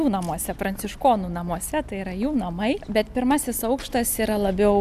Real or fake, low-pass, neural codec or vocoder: real; 14.4 kHz; none